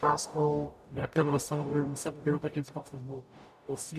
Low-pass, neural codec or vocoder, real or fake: 14.4 kHz; codec, 44.1 kHz, 0.9 kbps, DAC; fake